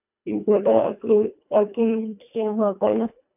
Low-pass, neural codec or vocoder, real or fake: 3.6 kHz; codec, 24 kHz, 1.5 kbps, HILCodec; fake